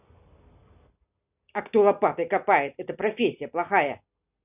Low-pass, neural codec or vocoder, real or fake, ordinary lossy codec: 3.6 kHz; none; real; none